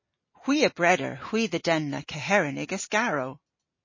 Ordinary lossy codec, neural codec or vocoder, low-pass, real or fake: MP3, 32 kbps; none; 7.2 kHz; real